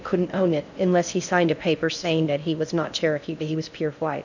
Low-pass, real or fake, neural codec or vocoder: 7.2 kHz; fake; codec, 16 kHz in and 24 kHz out, 0.6 kbps, FocalCodec, streaming, 4096 codes